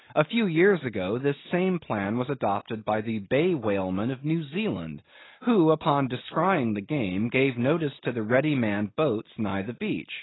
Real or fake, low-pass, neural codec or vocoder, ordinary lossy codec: real; 7.2 kHz; none; AAC, 16 kbps